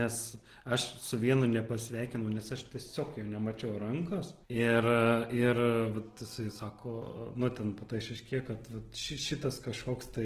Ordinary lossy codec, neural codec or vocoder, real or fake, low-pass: Opus, 16 kbps; none; real; 14.4 kHz